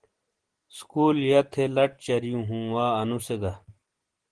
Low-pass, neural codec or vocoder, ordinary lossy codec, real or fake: 9.9 kHz; none; Opus, 16 kbps; real